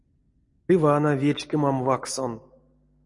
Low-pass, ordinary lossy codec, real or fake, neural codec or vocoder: 10.8 kHz; MP3, 48 kbps; real; none